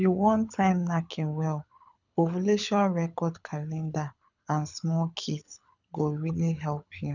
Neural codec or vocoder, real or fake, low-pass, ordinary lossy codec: codec, 24 kHz, 6 kbps, HILCodec; fake; 7.2 kHz; none